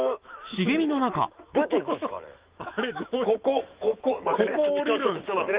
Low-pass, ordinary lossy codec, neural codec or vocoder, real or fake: 3.6 kHz; Opus, 32 kbps; autoencoder, 48 kHz, 128 numbers a frame, DAC-VAE, trained on Japanese speech; fake